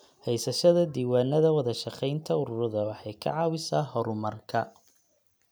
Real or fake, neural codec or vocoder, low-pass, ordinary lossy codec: real; none; none; none